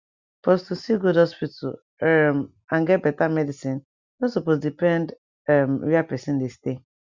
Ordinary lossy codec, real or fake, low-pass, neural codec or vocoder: Opus, 64 kbps; real; 7.2 kHz; none